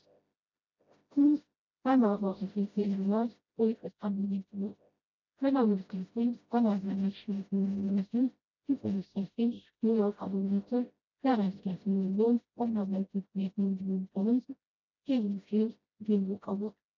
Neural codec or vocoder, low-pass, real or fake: codec, 16 kHz, 0.5 kbps, FreqCodec, smaller model; 7.2 kHz; fake